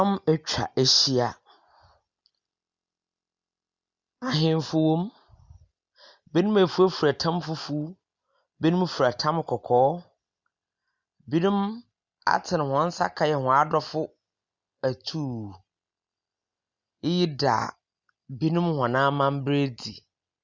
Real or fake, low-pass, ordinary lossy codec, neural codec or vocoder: real; 7.2 kHz; Opus, 64 kbps; none